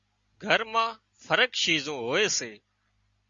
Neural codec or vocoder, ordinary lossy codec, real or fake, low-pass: none; Opus, 64 kbps; real; 7.2 kHz